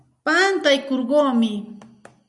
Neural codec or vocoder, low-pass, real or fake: none; 10.8 kHz; real